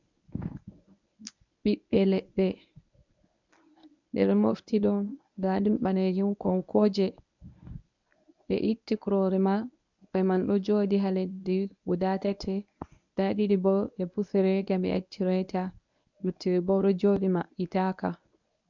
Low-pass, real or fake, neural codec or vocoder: 7.2 kHz; fake; codec, 24 kHz, 0.9 kbps, WavTokenizer, medium speech release version 1